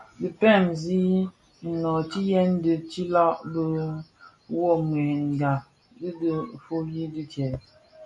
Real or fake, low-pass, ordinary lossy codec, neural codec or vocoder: real; 10.8 kHz; MP3, 48 kbps; none